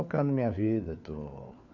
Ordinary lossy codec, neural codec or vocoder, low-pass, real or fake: none; codec, 16 kHz, 4 kbps, FunCodec, trained on LibriTTS, 50 frames a second; 7.2 kHz; fake